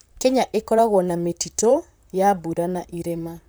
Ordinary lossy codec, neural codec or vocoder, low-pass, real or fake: none; vocoder, 44.1 kHz, 128 mel bands, Pupu-Vocoder; none; fake